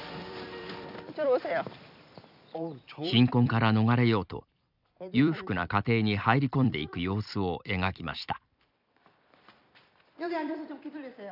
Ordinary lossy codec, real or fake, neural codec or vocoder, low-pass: none; fake; vocoder, 44.1 kHz, 128 mel bands every 256 samples, BigVGAN v2; 5.4 kHz